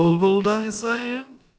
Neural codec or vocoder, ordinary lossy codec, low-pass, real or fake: codec, 16 kHz, about 1 kbps, DyCAST, with the encoder's durations; none; none; fake